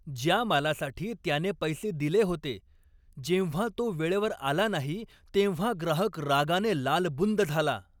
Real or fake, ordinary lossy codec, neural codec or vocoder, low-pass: real; none; none; 19.8 kHz